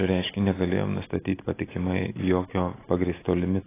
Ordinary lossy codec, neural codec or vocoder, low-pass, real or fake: AAC, 16 kbps; none; 3.6 kHz; real